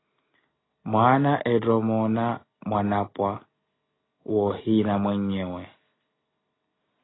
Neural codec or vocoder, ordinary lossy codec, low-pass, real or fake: none; AAC, 16 kbps; 7.2 kHz; real